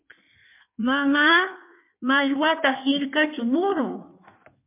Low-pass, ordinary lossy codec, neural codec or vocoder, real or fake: 3.6 kHz; MP3, 32 kbps; codec, 16 kHz in and 24 kHz out, 1.1 kbps, FireRedTTS-2 codec; fake